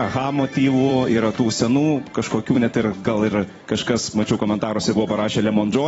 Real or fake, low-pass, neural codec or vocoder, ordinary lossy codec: fake; 19.8 kHz; vocoder, 44.1 kHz, 128 mel bands every 256 samples, BigVGAN v2; AAC, 24 kbps